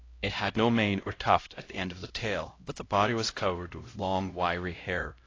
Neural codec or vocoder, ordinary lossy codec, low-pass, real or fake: codec, 16 kHz, 0.5 kbps, X-Codec, HuBERT features, trained on LibriSpeech; AAC, 32 kbps; 7.2 kHz; fake